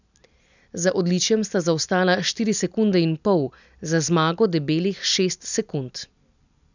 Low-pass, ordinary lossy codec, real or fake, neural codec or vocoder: 7.2 kHz; none; real; none